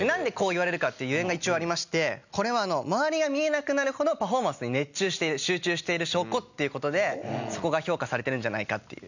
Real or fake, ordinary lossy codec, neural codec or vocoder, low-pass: real; none; none; 7.2 kHz